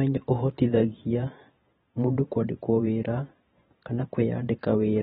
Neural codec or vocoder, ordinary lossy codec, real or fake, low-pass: vocoder, 44.1 kHz, 128 mel bands every 512 samples, BigVGAN v2; AAC, 16 kbps; fake; 19.8 kHz